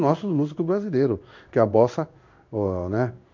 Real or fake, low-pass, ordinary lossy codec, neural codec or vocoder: fake; 7.2 kHz; MP3, 48 kbps; codec, 16 kHz in and 24 kHz out, 1 kbps, XY-Tokenizer